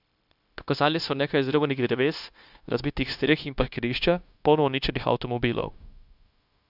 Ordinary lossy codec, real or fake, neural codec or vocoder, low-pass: none; fake; codec, 16 kHz, 0.9 kbps, LongCat-Audio-Codec; 5.4 kHz